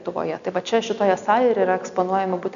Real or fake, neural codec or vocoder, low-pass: real; none; 7.2 kHz